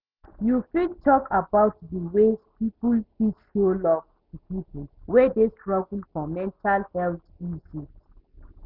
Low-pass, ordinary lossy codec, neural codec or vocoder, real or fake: 5.4 kHz; none; none; real